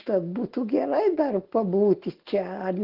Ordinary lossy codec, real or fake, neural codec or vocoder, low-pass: Opus, 16 kbps; real; none; 5.4 kHz